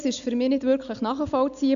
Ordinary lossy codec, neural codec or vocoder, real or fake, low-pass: MP3, 96 kbps; none; real; 7.2 kHz